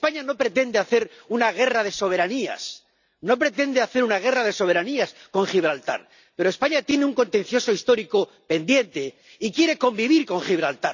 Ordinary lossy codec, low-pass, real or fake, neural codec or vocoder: none; 7.2 kHz; real; none